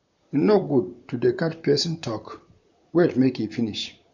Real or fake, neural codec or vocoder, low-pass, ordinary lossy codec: fake; vocoder, 44.1 kHz, 128 mel bands, Pupu-Vocoder; 7.2 kHz; none